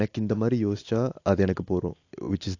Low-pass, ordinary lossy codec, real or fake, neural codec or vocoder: 7.2 kHz; AAC, 48 kbps; real; none